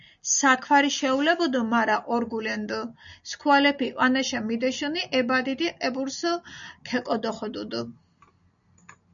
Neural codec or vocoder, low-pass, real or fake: none; 7.2 kHz; real